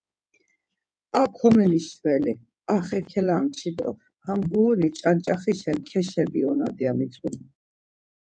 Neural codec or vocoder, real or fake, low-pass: codec, 16 kHz in and 24 kHz out, 2.2 kbps, FireRedTTS-2 codec; fake; 9.9 kHz